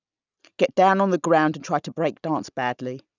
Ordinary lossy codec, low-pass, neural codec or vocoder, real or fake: none; 7.2 kHz; none; real